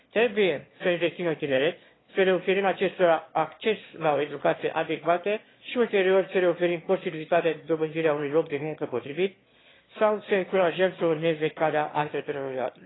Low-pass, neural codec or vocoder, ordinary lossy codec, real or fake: 7.2 kHz; autoencoder, 22.05 kHz, a latent of 192 numbers a frame, VITS, trained on one speaker; AAC, 16 kbps; fake